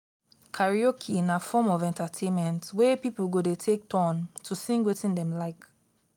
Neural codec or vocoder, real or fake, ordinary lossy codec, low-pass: none; real; none; 19.8 kHz